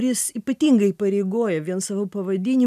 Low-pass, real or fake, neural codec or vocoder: 14.4 kHz; real; none